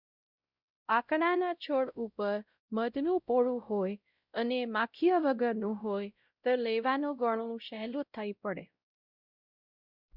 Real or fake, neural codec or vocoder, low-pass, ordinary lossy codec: fake; codec, 16 kHz, 0.5 kbps, X-Codec, WavLM features, trained on Multilingual LibriSpeech; 5.4 kHz; Opus, 64 kbps